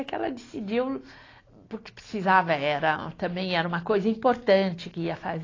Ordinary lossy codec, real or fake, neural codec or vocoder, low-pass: AAC, 32 kbps; real; none; 7.2 kHz